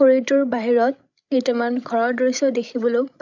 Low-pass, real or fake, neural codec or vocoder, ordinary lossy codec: 7.2 kHz; fake; codec, 16 kHz, 16 kbps, FreqCodec, larger model; none